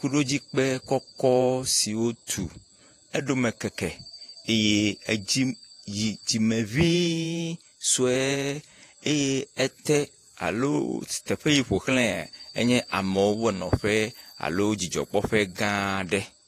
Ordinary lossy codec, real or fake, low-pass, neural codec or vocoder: AAC, 48 kbps; fake; 14.4 kHz; vocoder, 48 kHz, 128 mel bands, Vocos